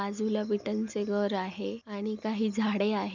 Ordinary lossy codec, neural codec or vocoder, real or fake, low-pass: none; none; real; 7.2 kHz